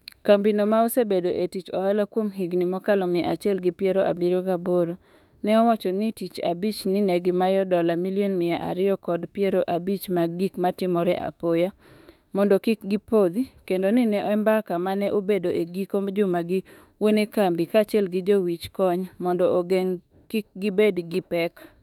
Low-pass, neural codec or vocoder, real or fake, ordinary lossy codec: 19.8 kHz; autoencoder, 48 kHz, 32 numbers a frame, DAC-VAE, trained on Japanese speech; fake; none